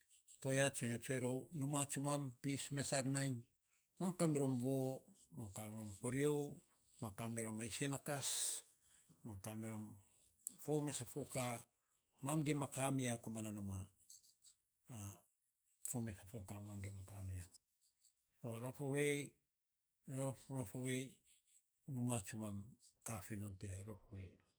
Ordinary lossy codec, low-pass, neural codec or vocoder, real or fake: none; none; codec, 44.1 kHz, 2.6 kbps, SNAC; fake